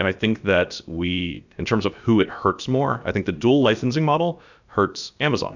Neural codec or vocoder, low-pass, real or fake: codec, 16 kHz, about 1 kbps, DyCAST, with the encoder's durations; 7.2 kHz; fake